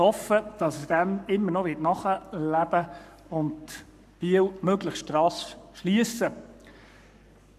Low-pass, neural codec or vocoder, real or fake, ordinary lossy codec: 14.4 kHz; codec, 44.1 kHz, 7.8 kbps, Pupu-Codec; fake; none